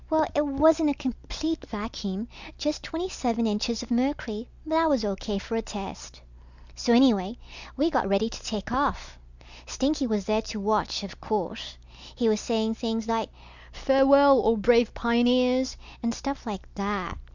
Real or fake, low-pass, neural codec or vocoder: real; 7.2 kHz; none